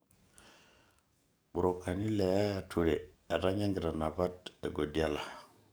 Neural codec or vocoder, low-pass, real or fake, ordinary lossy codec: codec, 44.1 kHz, 7.8 kbps, DAC; none; fake; none